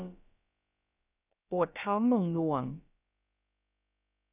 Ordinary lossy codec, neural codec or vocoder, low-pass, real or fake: none; codec, 16 kHz, about 1 kbps, DyCAST, with the encoder's durations; 3.6 kHz; fake